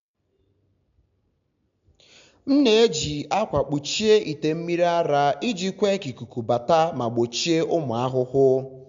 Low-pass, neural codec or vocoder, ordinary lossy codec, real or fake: 7.2 kHz; none; MP3, 64 kbps; real